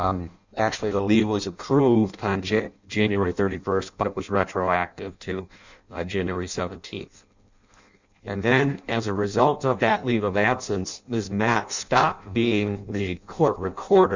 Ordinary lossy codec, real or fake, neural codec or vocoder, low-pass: Opus, 64 kbps; fake; codec, 16 kHz in and 24 kHz out, 0.6 kbps, FireRedTTS-2 codec; 7.2 kHz